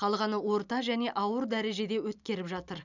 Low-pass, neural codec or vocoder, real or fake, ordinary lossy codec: 7.2 kHz; none; real; none